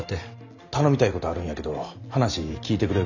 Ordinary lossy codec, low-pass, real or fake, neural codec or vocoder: none; 7.2 kHz; real; none